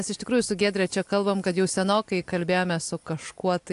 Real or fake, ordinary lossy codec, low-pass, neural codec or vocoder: real; AAC, 64 kbps; 10.8 kHz; none